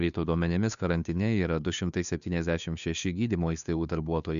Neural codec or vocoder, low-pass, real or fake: codec, 16 kHz, 2 kbps, FunCodec, trained on Chinese and English, 25 frames a second; 7.2 kHz; fake